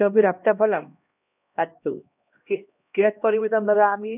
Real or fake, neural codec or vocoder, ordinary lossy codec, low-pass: fake; codec, 16 kHz, 1 kbps, X-Codec, HuBERT features, trained on LibriSpeech; none; 3.6 kHz